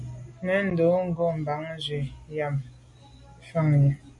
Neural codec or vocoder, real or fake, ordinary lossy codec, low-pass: none; real; MP3, 48 kbps; 10.8 kHz